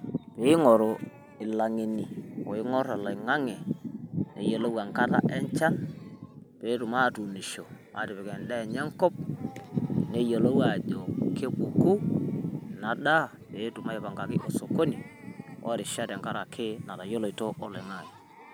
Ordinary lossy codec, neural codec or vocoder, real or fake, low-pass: none; none; real; none